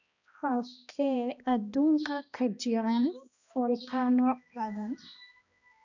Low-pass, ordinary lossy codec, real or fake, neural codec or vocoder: 7.2 kHz; none; fake; codec, 16 kHz, 1 kbps, X-Codec, HuBERT features, trained on balanced general audio